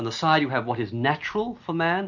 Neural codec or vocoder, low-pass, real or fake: none; 7.2 kHz; real